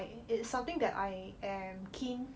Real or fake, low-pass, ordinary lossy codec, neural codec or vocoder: real; none; none; none